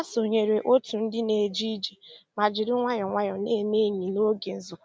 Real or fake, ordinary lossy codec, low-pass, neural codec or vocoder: real; none; none; none